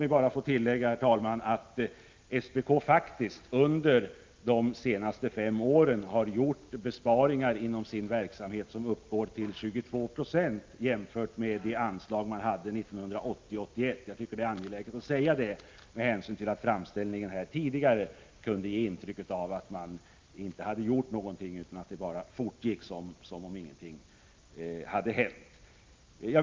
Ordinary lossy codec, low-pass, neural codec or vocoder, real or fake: Opus, 24 kbps; 7.2 kHz; none; real